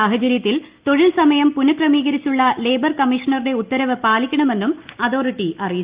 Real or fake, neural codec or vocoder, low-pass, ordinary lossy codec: real; none; 3.6 kHz; Opus, 32 kbps